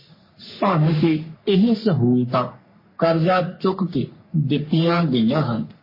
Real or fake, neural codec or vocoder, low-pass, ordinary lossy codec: fake; codec, 44.1 kHz, 3.4 kbps, Pupu-Codec; 5.4 kHz; MP3, 24 kbps